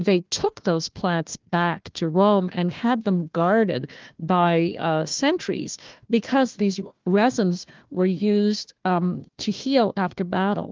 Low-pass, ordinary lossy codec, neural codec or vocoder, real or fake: 7.2 kHz; Opus, 32 kbps; codec, 16 kHz, 1 kbps, FunCodec, trained on Chinese and English, 50 frames a second; fake